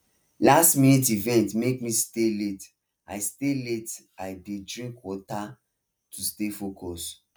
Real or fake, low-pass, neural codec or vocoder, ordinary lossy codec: real; none; none; none